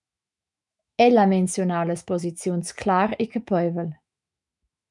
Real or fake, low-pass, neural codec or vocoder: fake; 10.8 kHz; autoencoder, 48 kHz, 128 numbers a frame, DAC-VAE, trained on Japanese speech